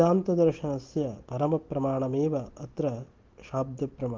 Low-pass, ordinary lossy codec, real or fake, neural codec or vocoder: 7.2 kHz; Opus, 16 kbps; real; none